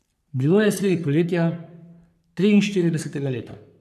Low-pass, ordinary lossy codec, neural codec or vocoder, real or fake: 14.4 kHz; none; codec, 44.1 kHz, 3.4 kbps, Pupu-Codec; fake